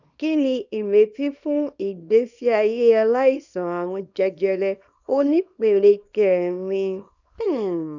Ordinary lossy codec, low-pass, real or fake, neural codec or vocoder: none; 7.2 kHz; fake; codec, 24 kHz, 0.9 kbps, WavTokenizer, small release